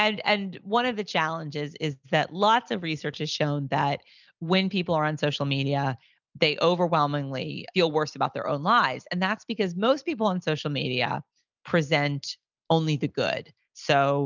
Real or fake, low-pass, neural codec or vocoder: real; 7.2 kHz; none